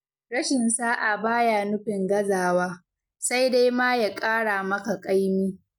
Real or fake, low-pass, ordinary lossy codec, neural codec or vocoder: real; 19.8 kHz; none; none